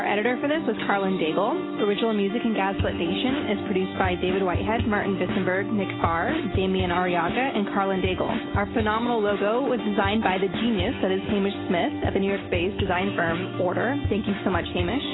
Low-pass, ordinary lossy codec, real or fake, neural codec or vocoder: 7.2 kHz; AAC, 16 kbps; real; none